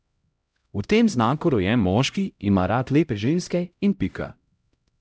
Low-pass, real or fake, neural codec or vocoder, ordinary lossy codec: none; fake; codec, 16 kHz, 0.5 kbps, X-Codec, HuBERT features, trained on LibriSpeech; none